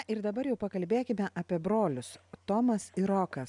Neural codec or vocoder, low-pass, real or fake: none; 10.8 kHz; real